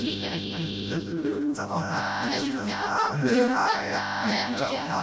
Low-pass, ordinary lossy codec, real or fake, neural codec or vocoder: none; none; fake; codec, 16 kHz, 0.5 kbps, FreqCodec, smaller model